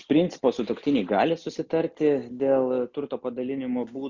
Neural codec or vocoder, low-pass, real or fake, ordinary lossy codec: none; 7.2 kHz; real; Opus, 64 kbps